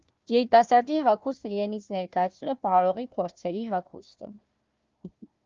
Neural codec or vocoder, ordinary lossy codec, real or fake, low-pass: codec, 16 kHz, 1 kbps, FunCodec, trained on Chinese and English, 50 frames a second; Opus, 32 kbps; fake; 7.2 kHz